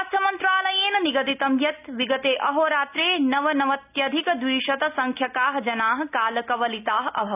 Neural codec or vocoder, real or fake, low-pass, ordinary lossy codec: none; real; 3.6 kHz; none